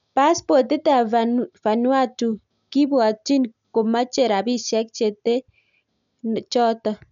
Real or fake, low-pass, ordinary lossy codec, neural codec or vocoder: real; 7.2 kHz; none; none